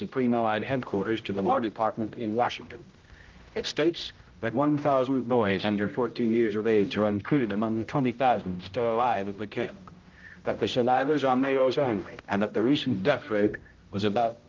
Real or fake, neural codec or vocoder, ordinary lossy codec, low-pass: fake; codec, 16 kHz, 0.5 kbps, X-Codec, HuBERT features, trained on general audio; Opus, 24 kbps; 7.2 kHz